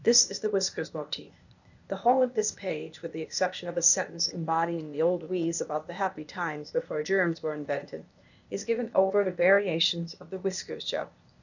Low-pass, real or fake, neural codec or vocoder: 7.2 kHz; fake; codec, 16 kHz, 0.8 kbps, ZipCodec